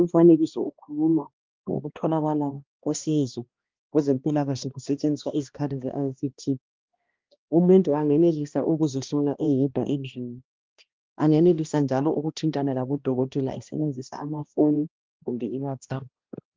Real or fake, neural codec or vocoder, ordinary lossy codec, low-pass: fake; codec, 16 kHz, 1 kbps, X-Codec, HuBERT features, trained on balanced general audio; Opus, 24 kbps; 7.2 kHz